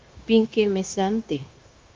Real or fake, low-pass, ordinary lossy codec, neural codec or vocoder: fake; 7.2 kHz; Opus, 24 kbps; codec, 16 kHz, 0.9 kbps, LongCat-Audio-Codec